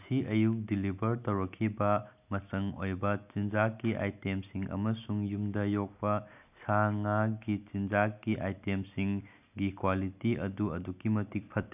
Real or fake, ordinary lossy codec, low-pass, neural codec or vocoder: real; none; 3.6 kHz; none